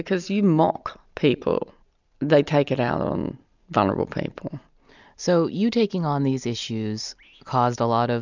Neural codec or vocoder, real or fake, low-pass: none; real; 7.2 kHz